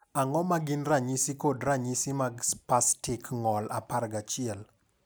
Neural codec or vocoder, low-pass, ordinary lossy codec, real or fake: none; none; none; real